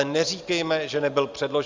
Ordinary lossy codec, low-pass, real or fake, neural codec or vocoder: Opus, 32 kbps; 7.2 kHz; real; none